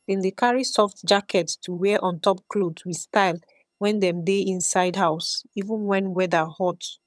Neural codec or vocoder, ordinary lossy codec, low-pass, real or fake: vocoder, 22.05 kHz, 80 mel bands, HiFi-GAN; none; none; fake